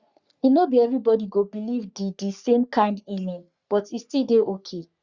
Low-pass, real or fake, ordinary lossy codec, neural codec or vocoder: 7.2 kHz; fake; Opus, 64 kbps; codec, 44.1 kHz, 3.4 kbps, Pupu-Codec